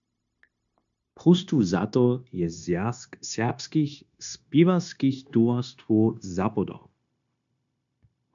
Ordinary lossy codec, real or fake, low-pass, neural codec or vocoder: MP3, 64 kbps; fake; 7.2 kHz; codec, 16 kHz, 0.9 kbps, LongCat-Audio-Codec